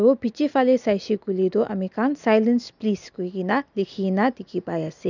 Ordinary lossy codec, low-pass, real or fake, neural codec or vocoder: none; 7.2 kHz; real; none